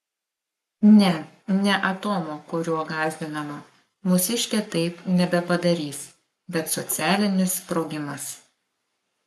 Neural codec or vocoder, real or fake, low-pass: codec, 44.1 kHz, 7.8 kbps, Pupu-Codec; fake; 14.4 kHz